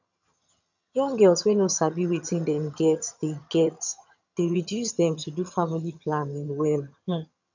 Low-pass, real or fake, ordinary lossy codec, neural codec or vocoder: 7.2 kHz; fake; none; vocoder, 22.05 kHz, 80 mel bands, HiFi-GAN